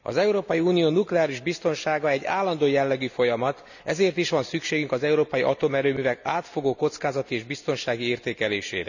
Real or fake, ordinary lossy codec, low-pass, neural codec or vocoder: real; none; 7.2 kHz; none